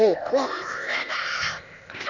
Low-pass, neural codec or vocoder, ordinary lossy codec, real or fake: 7.2 kHz; codec, 16 kHz, 0.8 kbps, ZipCodec; none; fake